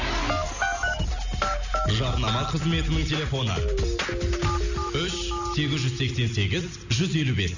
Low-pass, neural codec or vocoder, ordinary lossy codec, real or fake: 7.2 kHz; none; none; real